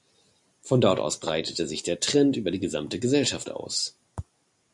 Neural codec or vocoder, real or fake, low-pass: none; real; 10.8 kHz